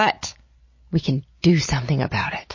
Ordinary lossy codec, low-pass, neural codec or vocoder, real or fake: MP3, 32 kbps; 7.2 kHz; none; real